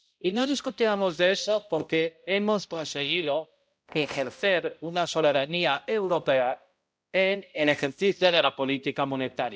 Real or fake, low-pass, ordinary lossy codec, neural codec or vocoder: fake; none; none; codec, 16 kHz, 0.5 kbps, X-Codec, HuBERT features, trained on balanced general audio